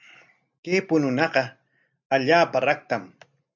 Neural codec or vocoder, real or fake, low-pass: none; real; 7.2 kHz